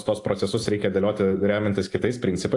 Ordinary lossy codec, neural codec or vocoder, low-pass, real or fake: AAC, 48 kbps; none; 10.8 kHz; real